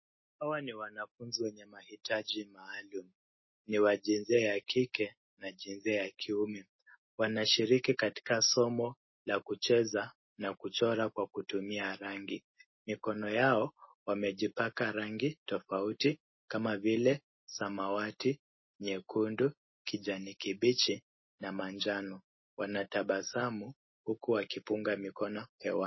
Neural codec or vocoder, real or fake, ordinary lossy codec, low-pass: none; real; MP3, 24 kbps; 7.2 kHz